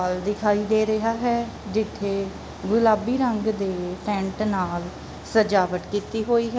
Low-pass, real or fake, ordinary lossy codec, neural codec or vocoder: none; real; none; none